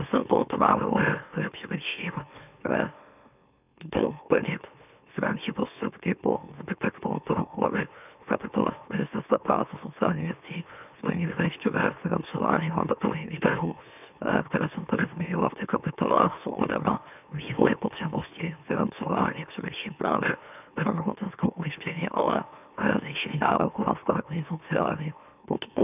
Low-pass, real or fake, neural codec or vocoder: 3.6 kHz; fake; autoencoder, 44.1 kHz, a latent of 192 numbers a frame, MeloTTS